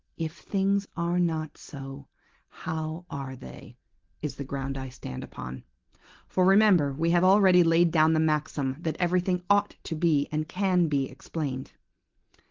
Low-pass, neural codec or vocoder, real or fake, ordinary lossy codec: 7.2 kHz; none; real; Opus, 16 kbps